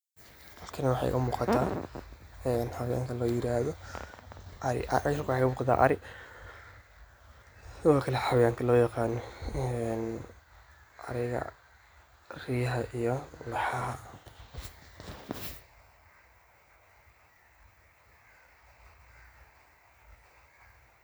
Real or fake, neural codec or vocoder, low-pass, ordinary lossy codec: real; none; none; none